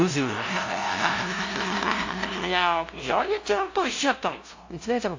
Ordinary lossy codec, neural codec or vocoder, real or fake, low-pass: AAC, 48 kbps; codec, 16 kHz, 0.5 kbps, FunCodec, trained on LibriTTS, 25 frames a second; fake; 7.2 kHz